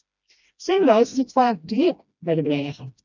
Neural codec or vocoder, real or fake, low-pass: codec, 16 kHz, 1 kbps, FreqCodec, smaller model; fake; 7.2 kHz